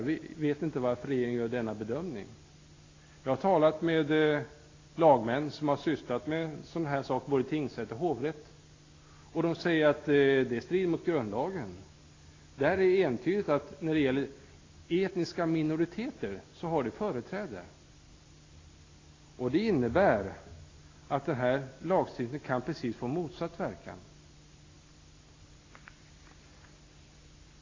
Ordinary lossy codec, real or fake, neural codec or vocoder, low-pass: AAC, 32 kbps; real; none; 7.2 kHz